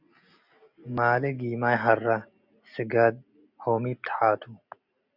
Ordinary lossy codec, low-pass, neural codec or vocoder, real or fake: Opus, 64 kbps; 5.4 kHz; none; real